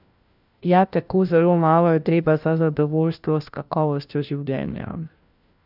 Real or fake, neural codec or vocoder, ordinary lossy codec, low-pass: fake; codec, 16 kHz, 1 kbps, FunCodec, trained on LibriTTS, 50 frames a second; none; 5.4 kHz